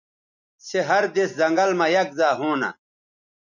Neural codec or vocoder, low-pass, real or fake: none; 7.2 kHz; real